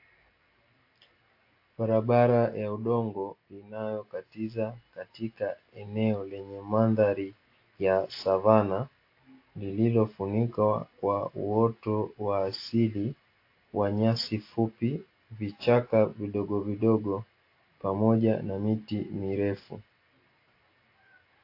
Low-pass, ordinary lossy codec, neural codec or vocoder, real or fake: 5.4 kHz; AAC, 32 kbps; none; real